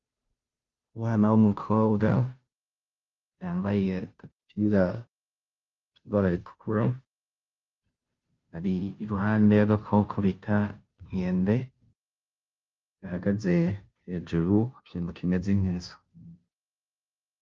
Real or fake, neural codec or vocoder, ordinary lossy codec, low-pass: fake; codec, 16 kHz, 0.5 kbps, FunCodec, trained on Chinese and English, 25 frames a second; Opus, 32 kbps; 7.2 kHz